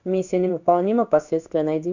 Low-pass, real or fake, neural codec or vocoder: 7.2 kHz; fake; codec, 16 kHz in and 24 kHz out, 1 kbps, XY-Tokenizer